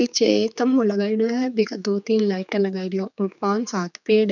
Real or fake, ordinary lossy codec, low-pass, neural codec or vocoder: fake; none; 7.2 kHz; codec, 16 kHz, 4 kbps, X-Codec, HuBERT features, trained on general audio